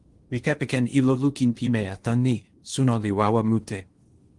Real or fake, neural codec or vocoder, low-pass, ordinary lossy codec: fake; codec, 16 kHz in and 24 kHz out, 0.8 kbps, FocalCodec, streaming, 65536 codes; 10.8 kHz; Opus, 24 kbps